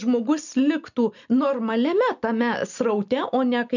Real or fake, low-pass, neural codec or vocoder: real; 7.2 kHz; none